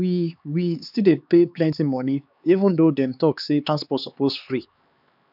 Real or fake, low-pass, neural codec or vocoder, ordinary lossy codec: fake; 5.4 kHz; codec, 16 kHz, 4 kbps, X-Codec, HuBERT features, trained on LibriSpeech; none